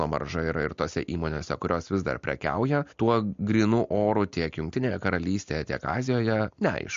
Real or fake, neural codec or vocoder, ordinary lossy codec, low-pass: real; none; MP3, 48 kbps; 7.2 kHz